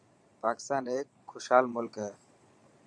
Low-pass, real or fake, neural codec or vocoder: 9.9 kHz; fake; vocoder, 22.05 kHz, 80 mel bands, Vocos